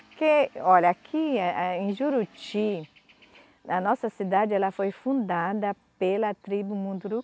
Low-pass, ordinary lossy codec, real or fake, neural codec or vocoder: none; none; real; none